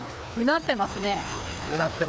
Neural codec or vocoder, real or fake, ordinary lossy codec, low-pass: codec, 16 kHz, 2 kbps, FreqCodec, larger model; fake; none; none